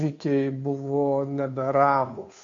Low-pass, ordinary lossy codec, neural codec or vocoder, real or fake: 7.2 kHz; MP3, 48 kbps; codec, 16 kHz, 2 kbps, FunCodec, trained on Chinese and English, 25 frames a second; fake